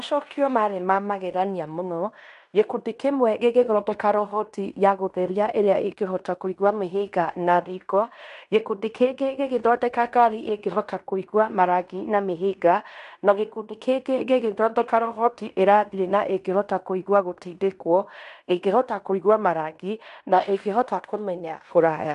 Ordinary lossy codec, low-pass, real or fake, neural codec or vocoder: none; 10.8 kHz; fake; codec, 16 kHz in and 24 kHz out, 0.9 kbps, LongCat-Audio-Codec, fine tuned four codebook decoder